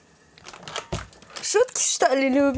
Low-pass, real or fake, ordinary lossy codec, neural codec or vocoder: none; real; none; none